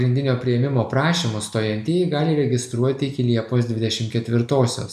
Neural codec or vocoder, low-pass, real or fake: none; 14.4 kHz; real